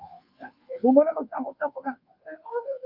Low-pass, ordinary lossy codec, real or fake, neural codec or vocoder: 5.4 kHz; Opus, 32 kbps; fake; codec, 24 kHz, 1.2 kbps, DualCodec